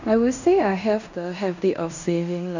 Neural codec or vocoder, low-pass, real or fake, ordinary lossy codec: codec, 16 kHz in and 24 kHz out, 0.9 kbps, LongCat-Audio-Codec, fine tuned four codebook decoder; 7.2 kHz; fake; none